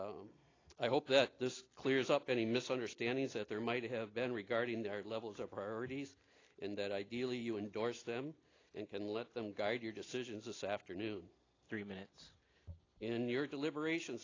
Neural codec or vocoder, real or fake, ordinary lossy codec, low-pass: none; real; AAC, 32 kbps; 7.2 kHz